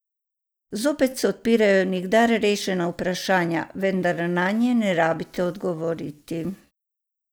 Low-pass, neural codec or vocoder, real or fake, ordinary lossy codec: none; none; real; none